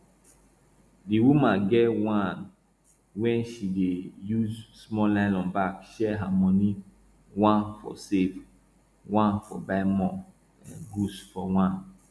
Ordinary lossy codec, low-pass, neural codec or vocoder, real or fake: none; none; none; real